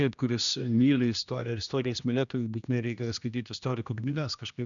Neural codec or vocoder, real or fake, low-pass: codec, 16 kHz, 1 kbps, X-Codec, HuBERT features, trained on general audio; fake; 7.2 kHz